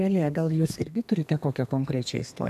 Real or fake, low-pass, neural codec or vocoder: fake; 14.4 kHz; codec, 32 kHz, 1.9 kbps, SNAC